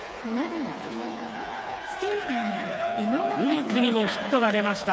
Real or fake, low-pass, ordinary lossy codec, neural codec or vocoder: fake; none; none; codec, 16 kHz, 4 kbps, FreqCodec, smaller model